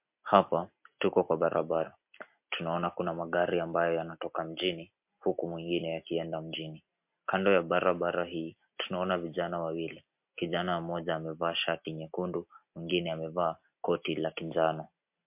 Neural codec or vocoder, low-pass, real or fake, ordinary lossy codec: none; 3.6 kHz; real; MP3, 32 kbps